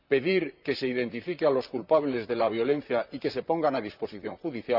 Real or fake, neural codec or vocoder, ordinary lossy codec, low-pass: fake; vocoder, 44.1 kHz, 128 mel bands, Pupu-Vocoder; none; 5.4 kHz